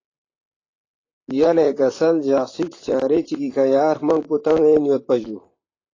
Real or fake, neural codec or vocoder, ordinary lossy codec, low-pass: fake; vocoder, 44.1 kHz, 128 mel bands, Pupu-Vocoder; AAC, 32 kbps; 7.2 kHz